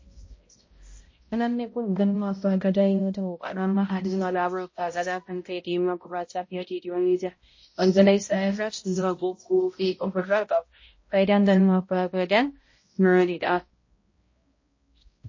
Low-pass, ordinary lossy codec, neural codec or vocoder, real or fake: 7.2 kHz; MP3, 32 kbps; codec, 16 kHz, 0.5 kbps, X-Codec, HuBERT features, trained on balanced general audio; fake